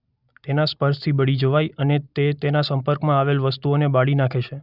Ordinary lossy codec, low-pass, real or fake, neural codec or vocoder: none; 5.4 kHz; real; none